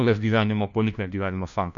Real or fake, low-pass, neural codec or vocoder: fake; 7.2 kHz; codec, 16 kHz, 1 kbps, FunCodec, trained on LibriTTS, 50 frames a second